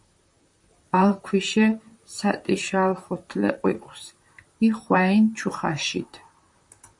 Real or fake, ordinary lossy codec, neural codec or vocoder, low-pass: fake; MP3, 64 kbps; vocoder, 44.1 kHz, 128 mel bands, Pupu-Vocoder; 10.8 kHz